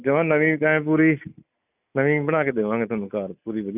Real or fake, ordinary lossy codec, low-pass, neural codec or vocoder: real; none; 3.6 kHz; none